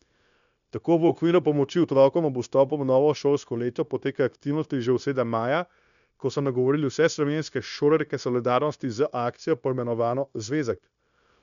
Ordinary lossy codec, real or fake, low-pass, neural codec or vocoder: none; fake; 7.2 kHz; codec, 16 kHz, 0.9 kbps, LongCat-Audio-Codec